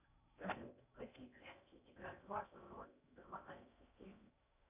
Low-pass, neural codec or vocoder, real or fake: 3.6 kHz; codec, 16 kHz in and 24 kHz out, 0.6 kbps, FocalCodec, streaming, 4096 codes; fake